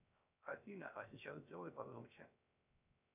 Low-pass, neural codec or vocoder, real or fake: 3.6 kHz; codec, 16 kHz, 0.3 kbps, FocalCodec; fake